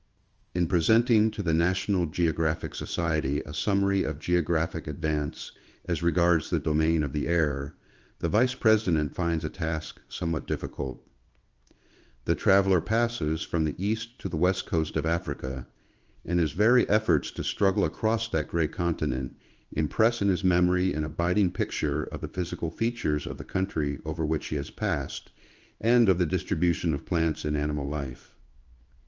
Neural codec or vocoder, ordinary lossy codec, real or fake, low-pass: codec, 16 kHz in and 24 kHz out, 1 kbps, XY-Tokenizer; Opus, 24 kbps; fake; 7.2 kHz